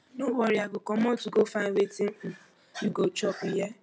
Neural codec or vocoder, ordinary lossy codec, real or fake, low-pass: none; none; real; none